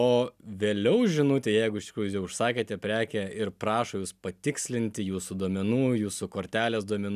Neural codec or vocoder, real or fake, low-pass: none; real; 14.4 kHz